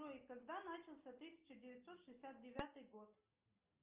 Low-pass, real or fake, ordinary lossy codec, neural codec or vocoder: 3.6 kHz; real; Opus, 24 kbps; none